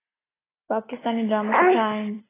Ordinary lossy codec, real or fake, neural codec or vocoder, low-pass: AAC, 16 kbps; real; none; 3.6 kHz